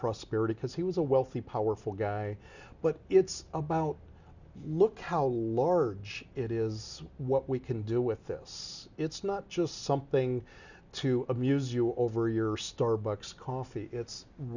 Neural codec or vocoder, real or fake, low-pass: none; real; 7.2 kHz